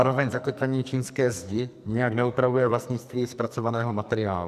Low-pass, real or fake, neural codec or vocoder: 14.4 kHz; fake; codec, 44.1 kHz, 2.6 kbps, SNAC